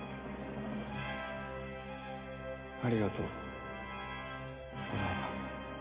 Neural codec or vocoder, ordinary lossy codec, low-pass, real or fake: none; Opus, 32 kbps; 3.6 kHz; real